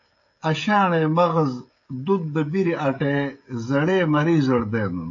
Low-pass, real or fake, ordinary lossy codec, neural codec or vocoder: 7.2 kHz; fake; AAC, 48 kbps; codec, 16 kHz, 16 kbps, FreqCodec, smaller model